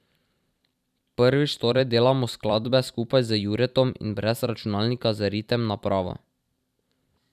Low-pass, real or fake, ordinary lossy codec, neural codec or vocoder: 14.4 kHz; fake; none; vocoder, 44.1 kHz, 128 mel bands every 256 samples, BigVGAN v2